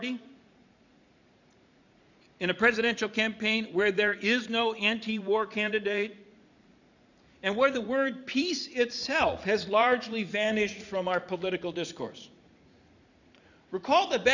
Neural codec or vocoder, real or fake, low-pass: vocoder, 44.1 kHz, 80 mel bands, Vocos; fake; 7.2 kHz